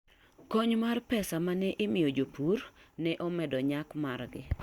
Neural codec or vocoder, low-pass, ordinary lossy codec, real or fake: none; 19.8 kHz; Opus, 64 kbps; real